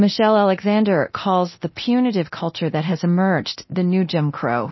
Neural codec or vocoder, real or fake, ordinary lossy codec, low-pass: codec, 24 kHz, 0.9 kbps, DualCodec; fake; MP3, 24 kbps; 7.2 kHz